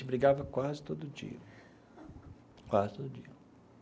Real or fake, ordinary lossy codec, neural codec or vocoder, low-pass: real; none; none; none